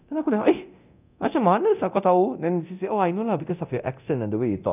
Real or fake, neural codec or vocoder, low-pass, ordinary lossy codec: fake; codec, 24 kHz, 0.9 kbps, DualCodec; 3.6 kHz; none